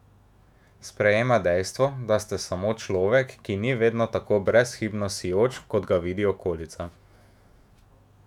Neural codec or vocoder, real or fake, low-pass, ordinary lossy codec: autoencoder, 48 kHz, 128 numbers a frame, DAC-VAE, trained on Japanese speech; fake; 19.8 kHz; none